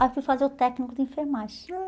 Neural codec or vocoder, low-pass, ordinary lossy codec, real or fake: none; none; none; real